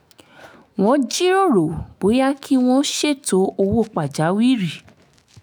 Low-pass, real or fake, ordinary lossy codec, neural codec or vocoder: none; fake; none; autoencoder, 48 kHz, 128 numbers a frame, DAC-VAE, trained on Japanese speech